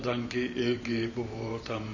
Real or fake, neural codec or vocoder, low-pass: fake; vocoder, 44.1 kHz, 128 mel bands every 512 samples, BigVGAN v2; 7.2 kHz